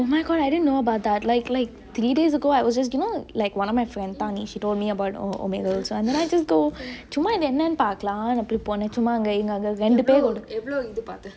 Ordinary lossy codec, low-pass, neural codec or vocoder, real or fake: none; none; none; real